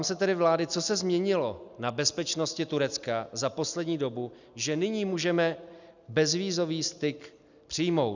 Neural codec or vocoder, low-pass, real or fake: none; 7.2 kHz; real